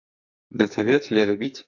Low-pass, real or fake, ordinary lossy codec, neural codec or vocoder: 7.2 kHz; fake; AAC, 48 kbps; codec, 44.1 kHz, 2.6 kbps, SNAC